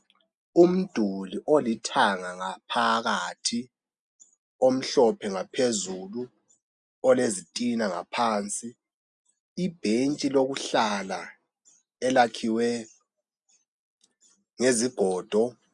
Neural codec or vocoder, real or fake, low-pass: none; real; 10.8 kHz